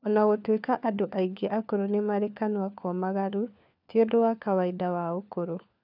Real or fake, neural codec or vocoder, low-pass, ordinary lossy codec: fake; codec, 16 kHz, 4 kbps, FunCodec, trained on LibriTTS, 50 frames a second; 5.4 kHz; none